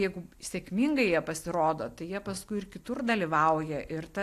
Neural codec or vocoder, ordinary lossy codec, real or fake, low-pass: none; AAC, 64 kbps; real; 14.4 kHz